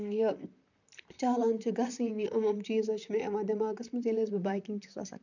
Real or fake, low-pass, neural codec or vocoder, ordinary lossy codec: fake; 7.2 kHz; vocoder, 44.1 kHz, 128 mel bands, Pupu-Vocoder; none